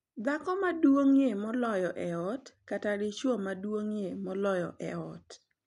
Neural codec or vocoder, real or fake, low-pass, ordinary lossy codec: none; real; 10.8 kHz; none